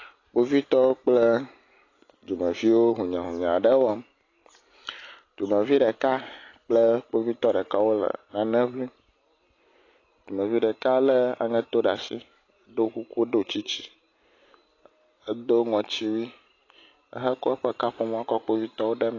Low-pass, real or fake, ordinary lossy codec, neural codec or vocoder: 7.2 kHz; real; AAC, 32 kbps; none